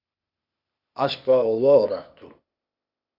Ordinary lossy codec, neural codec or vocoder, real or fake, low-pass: Opus, 64 kbps; codec, 16 kHz, 0.8 kbps, ZipCodec; fake; 5.4 kHz